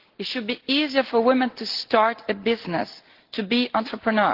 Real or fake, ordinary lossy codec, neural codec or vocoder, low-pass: real; Opus, 16 kbps; none; 5.4 kHz